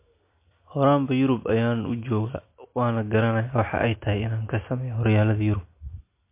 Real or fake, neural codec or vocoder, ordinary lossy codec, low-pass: real; none; MP3, 24 kbps; 3.6 kHz